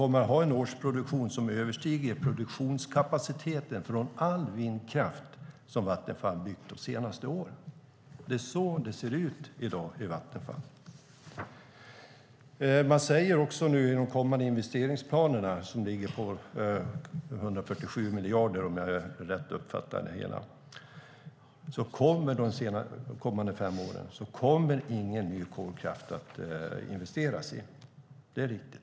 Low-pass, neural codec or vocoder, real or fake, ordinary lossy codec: none; none; real; none